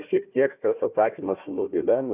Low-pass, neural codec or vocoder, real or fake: 3.6 kHz; codec, 16 kHz, 1 kbps, FunCodec, trained on Chinese and English, 50 frames a second; fake